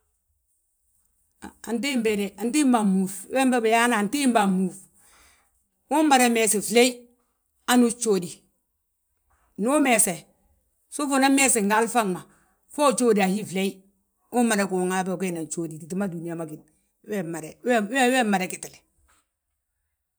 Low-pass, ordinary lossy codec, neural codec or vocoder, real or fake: none; none; vocoder, 44.1 kHz, 128 mel bands every 512 samples, BigVGAN v2; fake